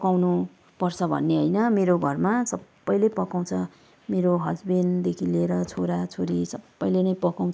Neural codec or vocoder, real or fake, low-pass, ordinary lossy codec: none; real; none; none